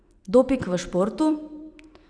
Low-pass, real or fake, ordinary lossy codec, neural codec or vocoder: 9.9 kHz; fake; none; vocoder, 44.1 kHz, 128 mel bands every 256 samples, BigVGAN v2